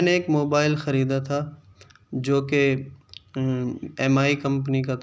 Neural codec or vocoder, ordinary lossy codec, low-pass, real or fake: none; none; none; real